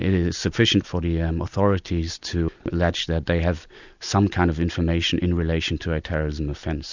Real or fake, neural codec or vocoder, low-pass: real; none; 7.2 kHz